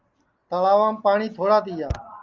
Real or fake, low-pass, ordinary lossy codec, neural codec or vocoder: real; 7.2 kHz; Opus, 24 kbps; none